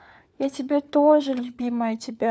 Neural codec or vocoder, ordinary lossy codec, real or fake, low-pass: codec, 16 kHz, 4 kbps, FunCodec, trained on LibriTTS, 50 frames a second; none; fake; none